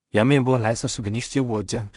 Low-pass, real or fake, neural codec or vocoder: 10.8 kHz; fake; codec, 16 kHz in and 24 kHz out, 0.4 kbps, LongCat-Audio-Codec, two codebook decoder